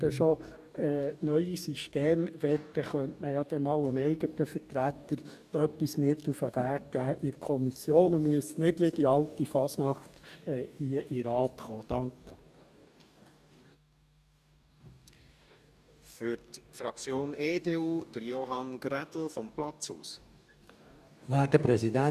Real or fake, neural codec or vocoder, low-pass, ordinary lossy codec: fake; codec, 44.1 kHz, 2.6 kbps, DAC; 14.4 kHz; none